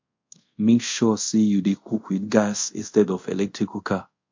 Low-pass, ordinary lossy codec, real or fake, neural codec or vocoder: 7.2 kHz; MP3, 48 kbps; fake; codec, 24 kHz, 0.5 kbps, DualCodec